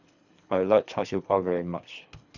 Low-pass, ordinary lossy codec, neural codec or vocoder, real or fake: 7.2 kHz; none; codec, 24 kHz, 3 kbps, HILCodec; fake